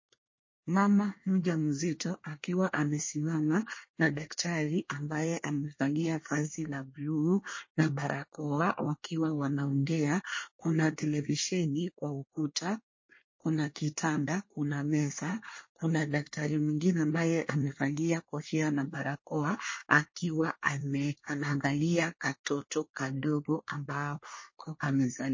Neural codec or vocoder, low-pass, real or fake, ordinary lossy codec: codec, 24 kHz, 1 kbps, SNAC; 7.2 kHz; fake; MP3, 32 kbps